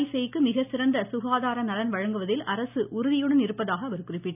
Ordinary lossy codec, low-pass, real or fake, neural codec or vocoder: none; 3.6 kHz; real; none